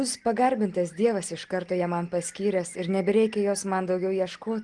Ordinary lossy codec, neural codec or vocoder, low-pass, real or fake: Opus, 24 kbps; none; 10.8 kHz; real